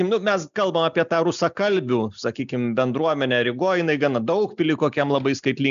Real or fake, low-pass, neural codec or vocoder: real; 7.2 kHz; none